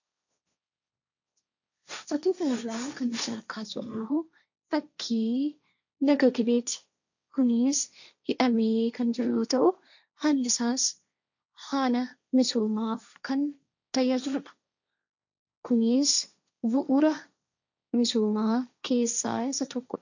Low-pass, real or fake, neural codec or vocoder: 7.2 kHz; fake; codec, 16 kHz, 1.1 kbps, Voila-Tokenizer